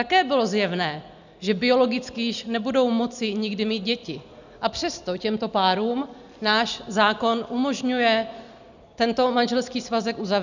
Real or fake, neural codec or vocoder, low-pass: real; none; 7.2 kHz